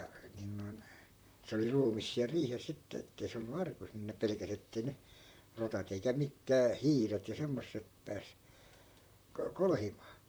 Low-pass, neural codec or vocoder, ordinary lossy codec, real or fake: none; vocoder, 44.1 kHz, 128 mel bands, Pupu-Vocoder; none; fake